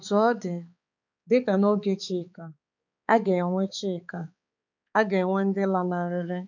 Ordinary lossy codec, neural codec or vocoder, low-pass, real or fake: none; codec, 16 kHz, 4 kbps, X-Codec, HuBERT features, trained on balanced general audio; 7.2 kHz; fake